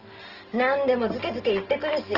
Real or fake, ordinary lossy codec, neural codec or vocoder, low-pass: real; Opus, 16 kbps; none; 5.4 kHz